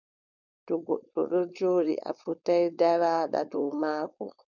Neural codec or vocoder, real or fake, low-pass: codec, 16 kHz, 4.8 kbps, FACodec; fake; 7.2 kHz